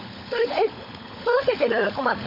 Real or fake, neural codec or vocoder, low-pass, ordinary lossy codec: fake; codec, 16 kHz, 16 kbps, FunCodec, trained on LibriTTS, 50 frames a second; 5.4 kHz; MP3, 48 kbps